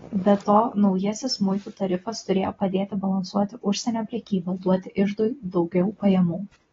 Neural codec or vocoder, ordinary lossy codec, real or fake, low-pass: none; AAC, 24 kbps; real; 7.2 kHz